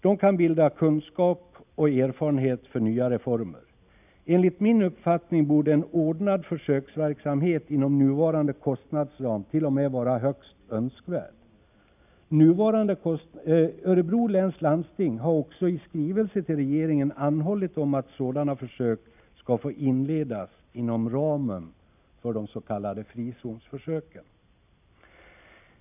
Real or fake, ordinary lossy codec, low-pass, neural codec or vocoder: real; none; 3.6 kHz; none